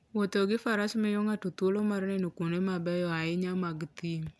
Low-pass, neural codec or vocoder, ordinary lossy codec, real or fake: none; none; none; real